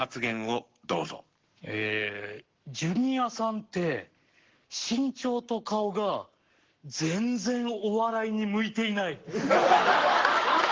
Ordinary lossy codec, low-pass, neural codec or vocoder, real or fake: Opus, 16 kbps; 7.2 kHz; codec, 44.1 kHz, 7.8 kbps, Pupu-Codec; fake